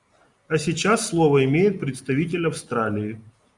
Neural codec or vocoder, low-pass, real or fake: none; 10.8 kHz; real